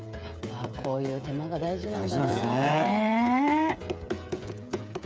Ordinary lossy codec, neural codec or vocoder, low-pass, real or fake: none; codec, 16 kHz, 8 kbps, FreqCodec, smaller model; none; fake